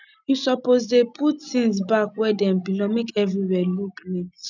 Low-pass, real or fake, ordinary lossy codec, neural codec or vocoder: 7.2 kHz; real; none; none